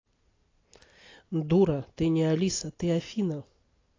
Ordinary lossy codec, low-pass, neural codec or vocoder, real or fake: AAC, 32 kbps; 7.2 kHz; none; real